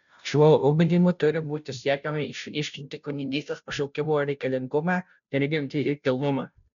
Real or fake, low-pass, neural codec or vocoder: fake; 7.2 kHz; codec, 16 kHz, 0.5 kbps, FunCodec, trained on Chinese and English, 25 frames a second